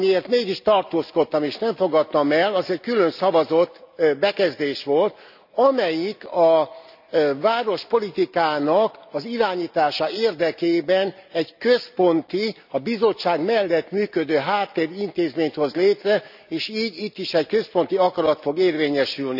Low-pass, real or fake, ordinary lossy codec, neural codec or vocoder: 5.4 kHz; real; none; none